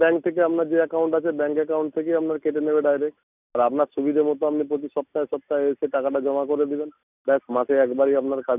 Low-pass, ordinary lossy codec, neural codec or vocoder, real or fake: 3.6 kHz; none; none; real